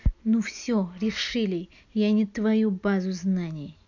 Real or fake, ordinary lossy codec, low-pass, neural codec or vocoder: real; none; 7.2 kHz; none